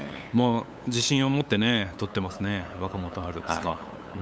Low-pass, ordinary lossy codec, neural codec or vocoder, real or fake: none; none; codec, 16 kHz, 8 kbps, FunCodec, trained on LibriTTS, 25 frames a second; fake